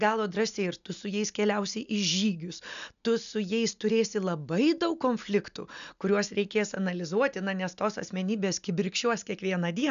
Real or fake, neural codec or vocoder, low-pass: real; none; 7.2 kHz